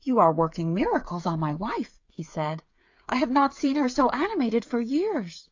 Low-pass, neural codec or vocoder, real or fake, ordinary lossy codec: 7.2 kHz; codec, 16 kHz, 8 kbps, FreqCodec, smaller model; fake; AAC, 48 kbps